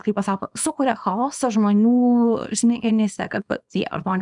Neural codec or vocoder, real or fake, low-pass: codec, 24 kHz, 0.9 kbps, WavTokenizer, small release; fake; 10.8 kHz